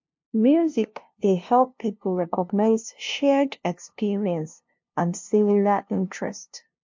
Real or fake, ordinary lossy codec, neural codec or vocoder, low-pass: fake; MP3, 48 kbps; codec, 16 kHz, 0.5 kbps, FunCodec, trained on LibriTTS, 25 frames a second; 7.2 kHz